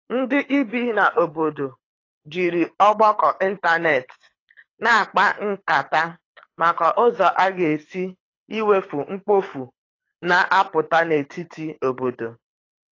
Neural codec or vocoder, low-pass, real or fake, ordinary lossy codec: codec, 24 kHz, 6 kbps, HILCodec; 7.2 kHz; fake; AAC, 32 kbps